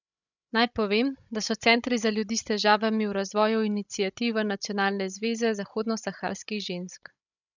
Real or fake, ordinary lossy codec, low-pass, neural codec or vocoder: fake; none; 7.2 kHz; codec, 16 kHz, 16 kbps, FreqCodec, larger model